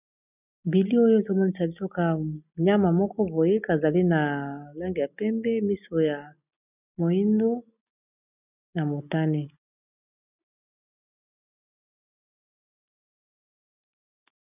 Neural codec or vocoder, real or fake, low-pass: none; real; 3.6 kHz